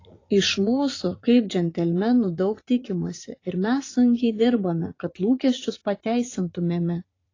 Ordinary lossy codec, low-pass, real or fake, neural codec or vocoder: AAC, 32 kbps; 7.2 kHz; fake; vocoder, 44.1 kHz, 80 mel bands, Vocos